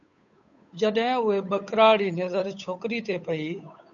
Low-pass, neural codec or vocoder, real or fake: 7.2 kHz; codec, 16 kHz, 8 kbps, FunCodec, trained on Chinese and English, 25 frames a second; fake